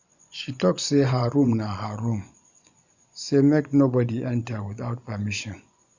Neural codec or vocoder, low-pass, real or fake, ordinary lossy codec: vocoder, 24 kHz, 100 mel bands, Vocos; 7.2 kHz; fake; none